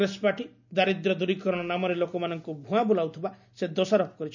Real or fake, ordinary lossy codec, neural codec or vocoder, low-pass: real; none; none; 7.2 kHz